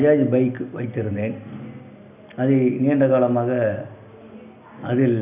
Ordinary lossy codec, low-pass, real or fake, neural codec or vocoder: none; 3.6 kHz; real; none